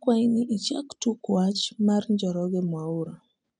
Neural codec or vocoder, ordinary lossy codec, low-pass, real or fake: none; none; 9.9 kHz; real